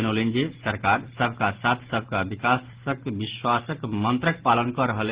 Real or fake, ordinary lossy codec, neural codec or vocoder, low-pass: real; Opus, 16 kbps; none; 3.6 kHz